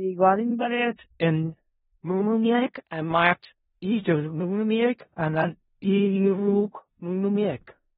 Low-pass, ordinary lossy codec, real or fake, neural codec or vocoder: 10.8 kHz; AAC, 16 kbps; fake; codec, 16 kHz in and 24 kHz out, 0.4 kbps, LongCat-Audio-Codec, four codebook decoder